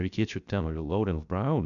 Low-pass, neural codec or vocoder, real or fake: 7.2 kHz; codec, 16 kHz, 0.3 kbps, FocalCodec; fake